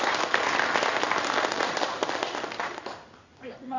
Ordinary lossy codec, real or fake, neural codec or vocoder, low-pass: none; fake; codec, 16 kHz in and 24 kHz out, 1 kbps, XY-Tokenizer; 7.2 kHz